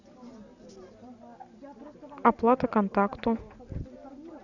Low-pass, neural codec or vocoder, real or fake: 7.2 kHz; none; real